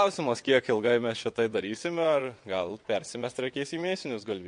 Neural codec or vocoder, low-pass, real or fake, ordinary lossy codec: vocoder, 22.05 kHz, 80 mel bands, WaveNeXt; 9.9 kHz; fake; MP3, 48 kbps